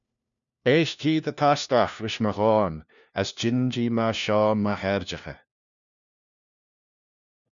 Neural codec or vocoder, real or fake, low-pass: codec, 16 kHz, 1 kbps, FunCodec, trained on LibriTTS, 50 frames a second; fake; 7.2 kHz